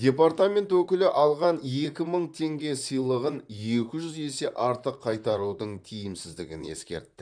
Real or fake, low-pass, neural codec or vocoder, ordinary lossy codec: fake; 9.9 kHz; vocoder, 44.1 kHz, 128 mel bands, Pupu-Vocoder; none